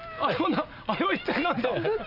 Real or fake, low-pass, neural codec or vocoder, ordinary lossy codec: real; 5.4 kHz; none; none